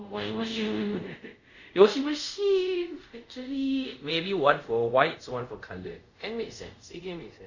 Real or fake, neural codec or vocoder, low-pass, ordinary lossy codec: fake; codec, 24 kHz, 0.5 kbps, DualCodec; 7.2 kHz; none